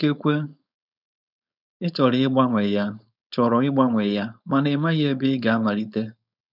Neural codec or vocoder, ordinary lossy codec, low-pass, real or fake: codec, 16 kHz, 4.8 kbps, FACodec; none; 5.4 kHz; fake